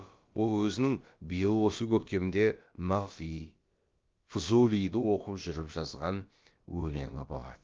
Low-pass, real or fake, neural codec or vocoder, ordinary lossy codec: 7.2 kHz; fake; codec, 16 kHz, about 1 kbps, DyCAST, with the encoder's durations; Opus, 32 kbps